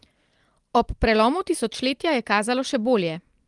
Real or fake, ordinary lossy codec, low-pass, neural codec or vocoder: real; Opus, 24 kbps; 10.8 kHz; none